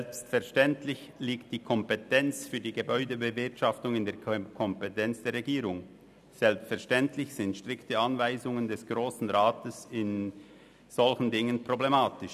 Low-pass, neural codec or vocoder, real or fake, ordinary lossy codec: 14.4 kHz; none; real; none